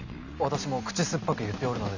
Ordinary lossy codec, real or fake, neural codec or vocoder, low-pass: none; real; none; 7.2 kHz